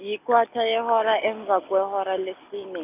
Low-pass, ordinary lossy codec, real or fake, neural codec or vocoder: 3.6 kHz; none; real; none